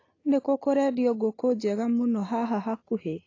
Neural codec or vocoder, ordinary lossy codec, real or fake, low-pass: none; AAC, 32 kbps; real; 7.2 kHz